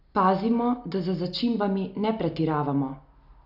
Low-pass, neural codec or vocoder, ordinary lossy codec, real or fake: 5.4 kHz; none; AAC, 48 kbps; real